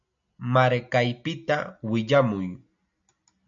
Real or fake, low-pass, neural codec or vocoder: real; 7.2 kHz; none